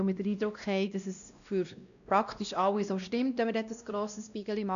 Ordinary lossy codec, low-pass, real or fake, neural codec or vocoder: none; 7.2 kHz; fake; codec, 16 kHz, 1 kbps, X-Codec, WavLM features, trained on Multilingual LibriSpeech